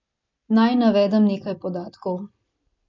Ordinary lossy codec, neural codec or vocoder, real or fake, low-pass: MP3, 64 kbps; none; real; 7.2 kHz